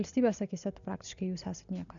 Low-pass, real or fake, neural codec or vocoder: 7.2 kHz; real; none